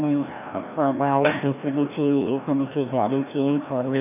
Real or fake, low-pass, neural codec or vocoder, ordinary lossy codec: fake; 3.6 kHz; codec, 16 kHz, 1 kbps, FreqCodec, larger model; none